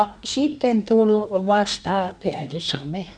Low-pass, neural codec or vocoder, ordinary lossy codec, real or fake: 9.9 kHz; codec, 24 kHz, 1 kbps, SNAC; none; fake